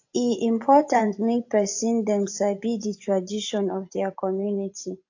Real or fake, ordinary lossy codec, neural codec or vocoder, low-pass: fake; AAC, 48 kbps; vocoder, 24 kHz, 100 mel bands, Vocos; 7.2 kHz